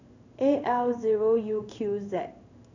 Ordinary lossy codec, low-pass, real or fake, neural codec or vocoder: none; 7.2 kHz; fake; codec, 16 kHz in and 24 kHz out, 1 kbps, XY-Tokenizer